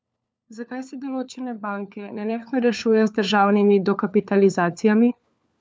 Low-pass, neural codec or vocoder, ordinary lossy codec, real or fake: none; codec, 16 kHz, 4 kbps, FunCodec, trained on LibriTTS, 50 frames a second; none; fake